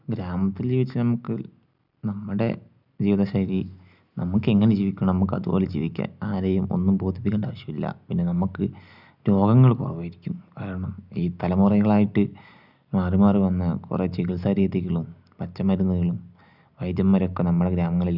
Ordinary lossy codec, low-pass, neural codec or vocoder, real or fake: none; 5.4 kHz; none; real